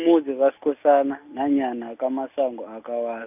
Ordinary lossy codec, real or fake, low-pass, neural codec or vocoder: none; real; 3.6 kHz; none